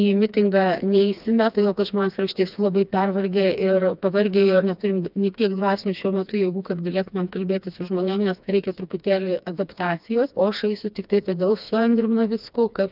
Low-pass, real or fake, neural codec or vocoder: 5.4 kHz; fake; codec, 16 kHz, 2 kbps, FreqCodec, smaller model